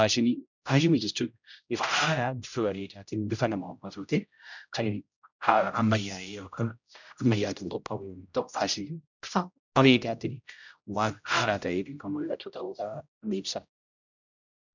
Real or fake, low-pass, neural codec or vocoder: fake; 7.2 kHz; codec, 16 kHz, 0.5 kbps, X-Codec, HuBERT features, trained on general audio